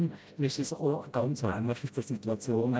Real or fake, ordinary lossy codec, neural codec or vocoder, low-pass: fake; none; codec, 16 kHz, 0.5 kbps, FreqCodec, smaller model; none